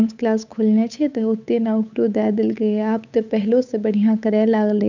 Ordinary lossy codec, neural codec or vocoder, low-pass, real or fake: none; codec, 16 kHz, 8 kbps, FunCodec, trained on Chinese and English, 25 frames a second; 7.2 kHz; fake